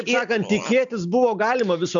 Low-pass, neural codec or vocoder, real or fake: 7.2 kHz; none; real